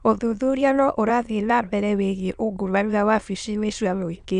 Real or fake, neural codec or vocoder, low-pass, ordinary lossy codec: fake; autoencoder, 22.05 kHz, a latent of 192 numbers a frame, VITS, trained on many speakers; 9.9 kHz; none